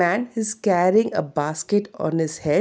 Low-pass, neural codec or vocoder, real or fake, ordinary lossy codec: none; none; real; none